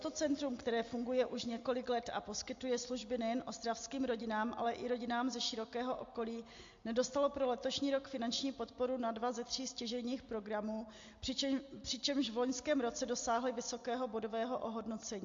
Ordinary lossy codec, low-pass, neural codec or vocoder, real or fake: MP3, 48 kbps; 7.2 kHz; none; real